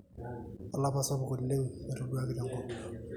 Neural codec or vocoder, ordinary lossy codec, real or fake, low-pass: none; none; real; 19.8 kHz